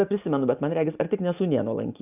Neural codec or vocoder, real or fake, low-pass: none; real; 3.6 kHz